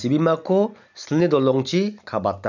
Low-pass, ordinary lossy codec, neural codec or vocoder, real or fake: 7.2 kHz; none; none; real